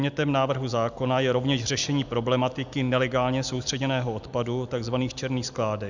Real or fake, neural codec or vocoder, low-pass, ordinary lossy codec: real; none; 7.2 kHz; Opus, 64 kbps